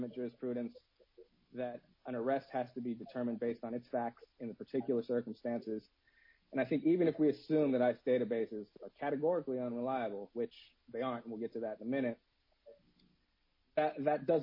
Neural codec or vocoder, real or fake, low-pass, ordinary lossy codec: none; real; 5.4 kHz; MP3, 24 kbps